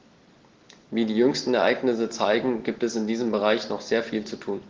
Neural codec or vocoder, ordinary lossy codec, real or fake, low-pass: none; Opus, 16 kbps; real; 7.2 kHz